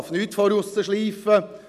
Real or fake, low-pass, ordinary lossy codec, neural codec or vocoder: real; 14.4 kHz; none; none